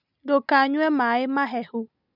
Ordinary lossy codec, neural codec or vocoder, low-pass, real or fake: none; none; 5.4 kHz; real